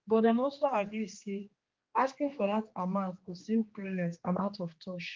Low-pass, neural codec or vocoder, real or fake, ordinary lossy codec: 7.2 kHz; codec, 16 kHz, 2 kbps, X-Codec, HuBERT features, trained on general audio; fake; Opus, 32 kbps